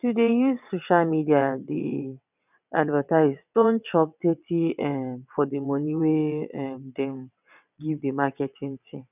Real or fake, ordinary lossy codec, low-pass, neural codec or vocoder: fake; none; 3.6 kHz; vocoder, 22.05 kHz, 80 mel bands, WaveNeXt